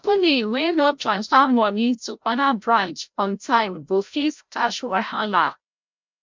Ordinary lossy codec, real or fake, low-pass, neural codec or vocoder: MP3, 64 kbps; fake; 7.2 kHz; codec, 16 kHz, 0.5 kbps, FreqCodec, larger model